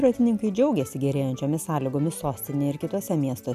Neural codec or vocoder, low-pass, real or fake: none; 14.4 kHz; real